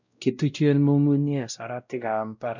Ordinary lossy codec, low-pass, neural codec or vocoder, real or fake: none; 7.2 kHz; codec, 16 kHz, 0.5 kbps, X-Codec, WavLM features, trained on Multilingual LibriSpeech; fake